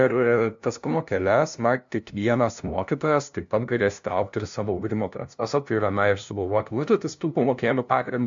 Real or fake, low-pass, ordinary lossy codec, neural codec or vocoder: fake; 7.2 kHz; MP3, 64 kbps; codec, 16 kHz, 0.5 kbps, FunCodec, trained on LibriTTS, 25 frames a second